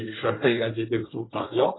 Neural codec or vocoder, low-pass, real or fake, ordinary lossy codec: codec, 24 kHz, 1 kbps, SNAC; 7.2 kHz; fake; AAC, 16 kbps